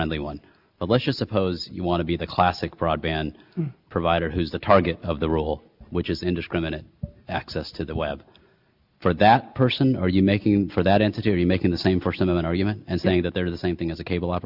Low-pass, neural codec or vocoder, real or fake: 5.4 kHz; none; real